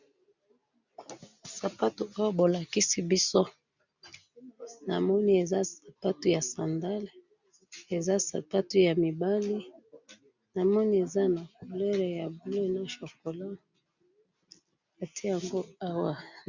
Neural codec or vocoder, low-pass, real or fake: none; 7.2 kHz; real